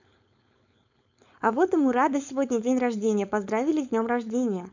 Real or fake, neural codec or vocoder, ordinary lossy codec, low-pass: fake; codec, 16 kHz, 4.8 kbps, FACodec; none; 7.2 kHz